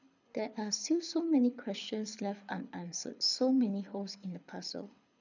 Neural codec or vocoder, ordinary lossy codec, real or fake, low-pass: codec, 24 kHz, 6 kbps, HILCodec; none; fake; 7.2 kHz